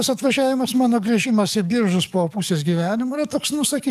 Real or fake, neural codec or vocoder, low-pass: fake; codec, 44.1 kHz, 7.8 kbps, DAC; 14.4 kHz